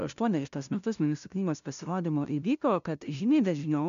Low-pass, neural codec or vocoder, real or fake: 7.2 kHz; codec, 16 kHz, 0.5 kbps, FunCodec, trained on LibriTTS, 25 frames a second; fake